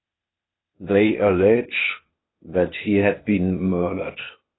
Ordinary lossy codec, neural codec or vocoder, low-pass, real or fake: AAC, 16 kbps; codec, 16 kHz, 0.8 kbps, ZipCodec; 7.2 kHz; fake